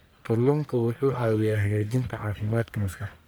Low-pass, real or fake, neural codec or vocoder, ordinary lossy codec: none; fake; codec, 44.1 kHz, 1.7 kbps, Pupu-Codec; none